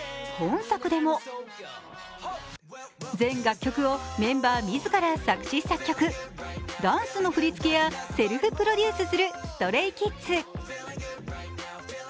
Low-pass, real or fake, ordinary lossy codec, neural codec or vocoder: none; real; none; none